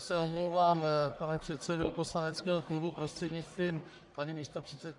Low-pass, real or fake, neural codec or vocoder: 10.8 kHz; fake; codec, 44.1 kHz, 1.7 kbps, Pupu-Codec